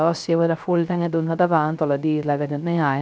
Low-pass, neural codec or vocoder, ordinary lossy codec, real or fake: none; codec, 16 kHz, 0.3 kbps, FocalCodec; none; fake